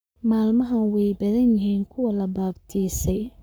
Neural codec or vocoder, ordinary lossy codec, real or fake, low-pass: codec, 44.1 kHz, 7.8 kbps, Pupu-Codec; none; fake; none